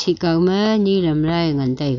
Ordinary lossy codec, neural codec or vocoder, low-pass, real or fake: none; none; 7.2 kHz; real